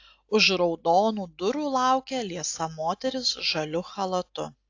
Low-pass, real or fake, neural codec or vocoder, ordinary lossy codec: 7.2 kHz; real; none; AAC, 48 kbps